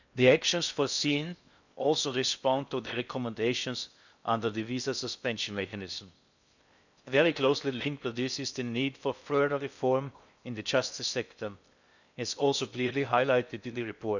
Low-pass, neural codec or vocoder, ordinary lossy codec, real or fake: 7.2 kHz; codec, 16 kHz in and 24 kHz out, 0.6 kbps, FocalCodec, streaming, 4096 codes; none; fake